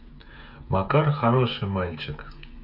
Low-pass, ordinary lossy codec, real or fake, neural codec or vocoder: 5.4 kHz; none; fake; codec, 16 kHz, 8 kbps, FreqCodec, smaller model